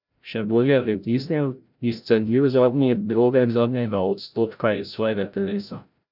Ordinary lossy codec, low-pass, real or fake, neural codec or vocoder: none; 5.4 kHz; fake; codec, 16 kHz, 0.5 kbps, FreqCodec, larger model